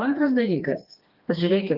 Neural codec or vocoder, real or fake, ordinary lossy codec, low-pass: codec, 16 kHz, 2 kbps, FreqCodec, smaller model; fake; Opus, 32 kbps; 5.4 kHz